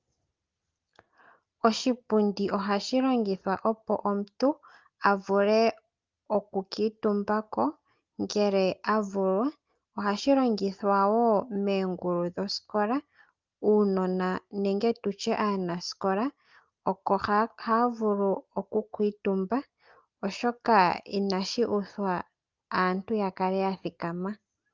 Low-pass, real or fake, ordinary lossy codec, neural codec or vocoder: 7.2 kHz; real; Opus, 24 kbps; none